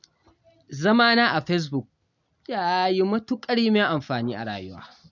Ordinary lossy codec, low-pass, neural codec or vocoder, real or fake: none; 7.2 kHz; none; real